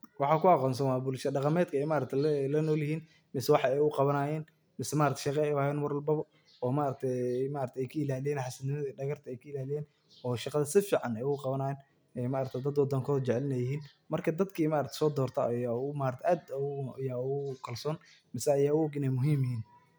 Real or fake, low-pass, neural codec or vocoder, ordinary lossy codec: real; none; none; none